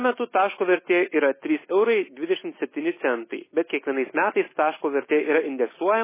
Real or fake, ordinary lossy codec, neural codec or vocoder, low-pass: real; MP3, 16 kbps; none; 3.6 kHz